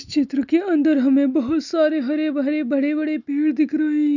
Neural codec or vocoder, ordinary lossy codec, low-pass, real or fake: none; none; 7.2 kHz; real